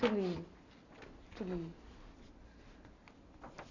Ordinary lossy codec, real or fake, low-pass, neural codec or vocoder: none; real; 7.2 kHz; none